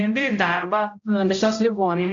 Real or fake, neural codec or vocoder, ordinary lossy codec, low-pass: fake; codec, 16 kHz, 0.5 kbps, X-Codec, HuBERT features, trained on general audio; MP3, 64 kbps; 7.2 kHz